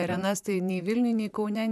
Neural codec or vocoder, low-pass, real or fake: vocoder, 44.1 kHz, 128 mel bands, Pupu-Vocoder; 14.4 kHz; fake